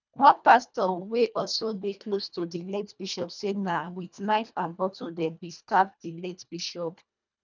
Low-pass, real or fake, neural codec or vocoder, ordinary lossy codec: 7.2 kHz; fake; codec, 24 kHz, 1.5 kbps, HILCodec; none